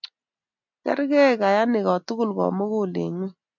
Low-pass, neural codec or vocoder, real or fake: 7.2 kHz; none; real